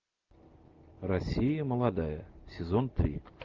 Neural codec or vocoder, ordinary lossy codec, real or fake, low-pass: none; Opus, 32 kbps; real; 7.2 kHz